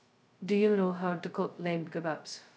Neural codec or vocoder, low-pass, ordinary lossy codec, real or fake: codec, 16 kHz, 0.2 kbps, FocalCodec; none; none; fake